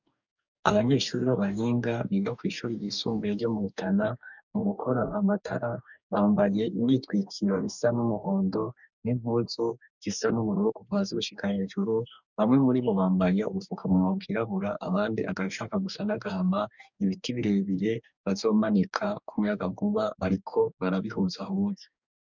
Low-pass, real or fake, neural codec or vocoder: 7.2 kHz; fake; codec, 44.1 kHz, 2.6 kbps, DAC